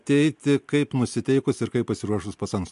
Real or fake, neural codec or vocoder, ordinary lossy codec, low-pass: real; none; MP3, 64 kbps; 10.8 kHz